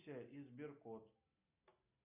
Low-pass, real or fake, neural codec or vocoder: 3.6 kHz; real; none